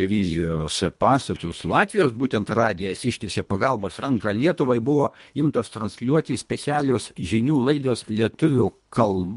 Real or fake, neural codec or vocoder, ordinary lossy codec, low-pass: fake; codec, 24 kHz, 1.5 kbps, HILCodec; MP3, 64 kbps; 10.8 kHz